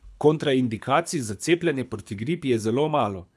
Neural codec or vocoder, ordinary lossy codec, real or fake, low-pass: codec, 24 kHz, 6 kbps, HILCodec; none; fake; none